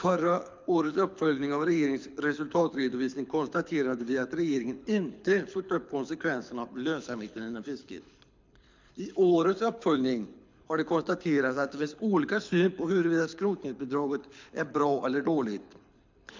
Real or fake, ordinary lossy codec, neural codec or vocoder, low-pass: fake; MP3, 64 kbps; codec, 24 kHz, 6 kbps, HILCodec; 7.2 kHz